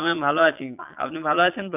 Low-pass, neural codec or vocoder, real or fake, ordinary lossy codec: 3.6 kHz; vocoder, 22.05 kHz, 80 mel bands, Vocos; fake; none